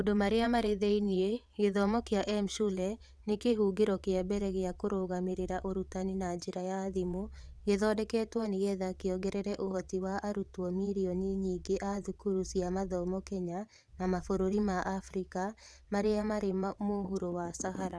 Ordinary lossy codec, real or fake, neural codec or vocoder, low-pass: none; fake; vocoder, 22.05 kHz, 80 mel bands, WaveNeXt; none